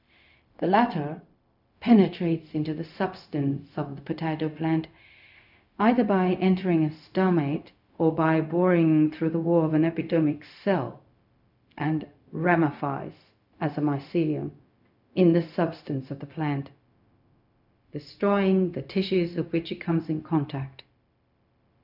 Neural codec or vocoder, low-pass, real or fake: codec, 16 kHz, 0.4 kbps, LongCat-Audio-Codec; 5.4 kHz; fake